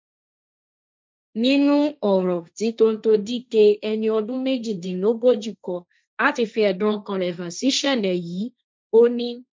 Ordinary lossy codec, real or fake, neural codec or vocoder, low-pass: none; fake; codec, 16 kHz, 1.1 kbps, Voila-Tokenizer; none